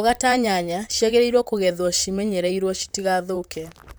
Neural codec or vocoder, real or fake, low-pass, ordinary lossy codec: vocoder, 44.1 kHz, 128 mel bands, Pupu-Vocoder; fake; none; none